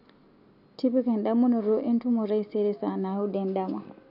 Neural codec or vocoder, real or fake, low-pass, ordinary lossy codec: none; real; 5.4 kHz; none